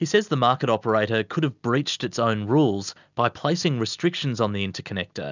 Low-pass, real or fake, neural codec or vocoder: 7.2 kHz; real; none